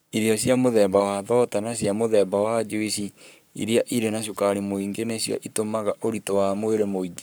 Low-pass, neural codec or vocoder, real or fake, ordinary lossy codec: none; codec, 44.1 kHz, 7.8 kbps, Pupu-Codec; fake; none